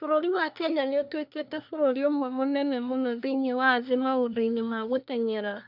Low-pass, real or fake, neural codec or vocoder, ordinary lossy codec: 5.4 kHz; fake; codec, 24 kHz, 1 kbps, SNAC; none